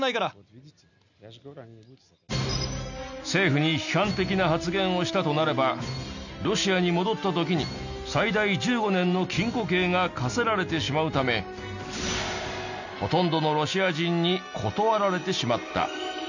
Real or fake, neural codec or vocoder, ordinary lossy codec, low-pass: real; none; none; 7.2 kHz